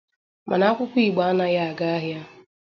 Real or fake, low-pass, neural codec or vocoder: real; 7.2 kHz; none